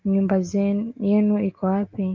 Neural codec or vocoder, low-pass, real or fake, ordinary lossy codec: none; 7.2 kHz; real; Opus, 24 kbps